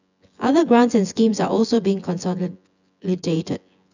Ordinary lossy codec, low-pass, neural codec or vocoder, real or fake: none; 7.2 kHz; vocoder, 24 kHz, 100 mel bands, Vocos; fake